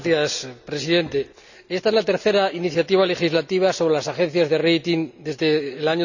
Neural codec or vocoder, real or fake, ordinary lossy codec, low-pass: none; real; none; 7.2 kHz